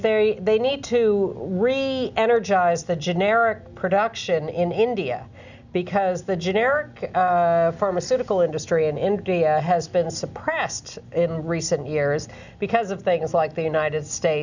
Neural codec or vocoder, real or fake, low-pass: none; real; 7.2 kHz